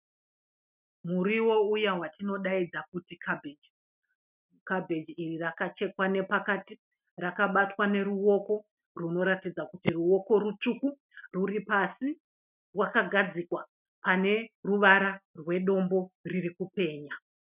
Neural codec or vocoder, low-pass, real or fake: none; 3.6 kHz; real